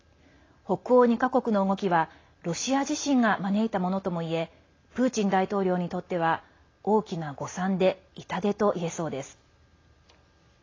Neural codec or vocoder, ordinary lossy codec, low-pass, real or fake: none; AAC, 32 kbps; 7.2 kHz; real